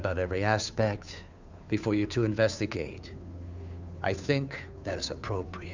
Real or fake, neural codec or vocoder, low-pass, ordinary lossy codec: fake; codec, 16 kHz, 2 kbps, FunCodec, trained on Chinese and English, 25 frames a second; 7.2 kHz; Opus, 64 kbps